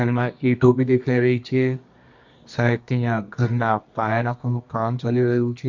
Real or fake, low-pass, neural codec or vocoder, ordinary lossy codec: fake; 7.2 kHz; codec, 24 kHz, 0.9 kbps, WavTokenizer, medium music audio release; MP3, 48 kbps